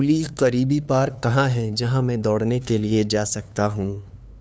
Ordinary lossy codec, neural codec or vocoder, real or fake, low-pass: none; codec, 16 kHz, 2 kbps, FunCodec, trained on LibriTTS, 25 frames a second; fake; none